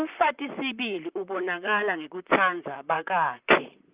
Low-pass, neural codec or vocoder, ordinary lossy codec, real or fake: 3.6 kHz; vocoder, 44.1 kHz, 128 mel bands, Pupu-Vocoder; Opus, 24 kbps; fake